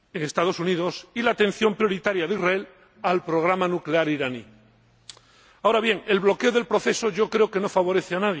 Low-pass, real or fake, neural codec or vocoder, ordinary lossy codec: none; real; none; none